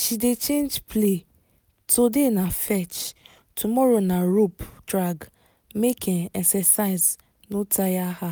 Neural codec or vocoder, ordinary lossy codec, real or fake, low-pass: none; none; real; none